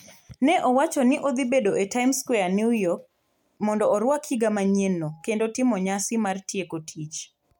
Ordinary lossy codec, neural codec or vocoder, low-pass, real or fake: MP3, 96 kbps; none; 19.8 kHz; real